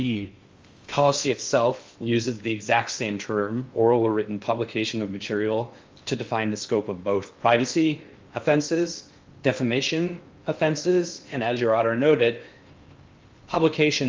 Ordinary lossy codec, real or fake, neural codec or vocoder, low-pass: Opus, 32 kbps; fake; codec, 16 kHz in and 24 kHz out, 0.6 kbps, FocalCodec, streaming, 2048 codes; 7.2 kHz